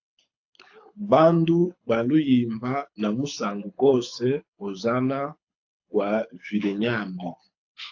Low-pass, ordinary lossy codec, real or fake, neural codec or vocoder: 7.2 kHz; AAC, 48 kbps; fake; codec, 24 kHz, 6 kbps, HILCodec